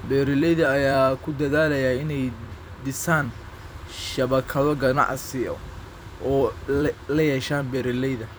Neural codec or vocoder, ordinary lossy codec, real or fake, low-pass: vocoder, 44.1 kHz, 128 mel bands every 256 samples, BigVGAN v2; none; fake; none